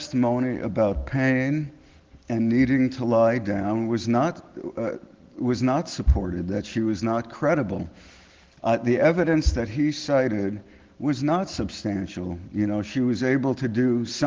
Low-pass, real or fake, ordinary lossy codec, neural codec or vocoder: 7.2 kHz; real; Opus, 16 kbps; none